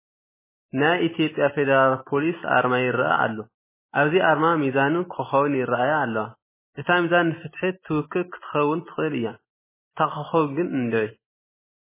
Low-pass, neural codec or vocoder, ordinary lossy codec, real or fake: 3.6 kHz; none; MP3, 16 kbps; real